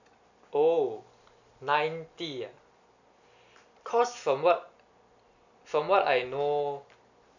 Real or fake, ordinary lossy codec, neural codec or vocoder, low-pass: real; none; none; 7.2 kHz